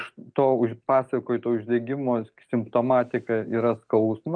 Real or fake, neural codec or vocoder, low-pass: real; none; 9.9 kHz